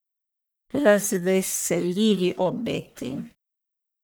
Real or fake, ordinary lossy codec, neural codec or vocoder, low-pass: fake; none; codec, 44.1 kHz, 1.7 kbps, Pupu-Codec; none